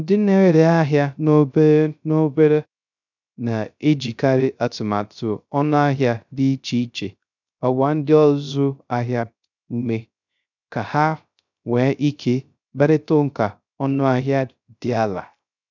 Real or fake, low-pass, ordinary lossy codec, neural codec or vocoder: fake; 7.2 kHz; none; codec, 16 kHz, 0.3 kbps, FocalCodec